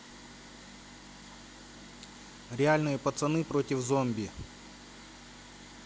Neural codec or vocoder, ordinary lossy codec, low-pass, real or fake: none; none; none; real